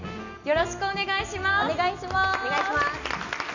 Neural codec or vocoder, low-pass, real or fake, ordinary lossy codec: none; 7.2 kHz; real; none